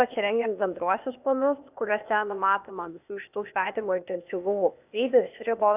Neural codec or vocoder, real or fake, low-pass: codec, 16 kHz, 0.8 kbps, ZipCodec; fake; 3.6 kHz